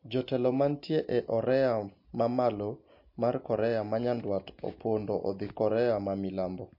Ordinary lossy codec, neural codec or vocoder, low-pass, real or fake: MP3, 32 kbps; none; 5.4 kHz; real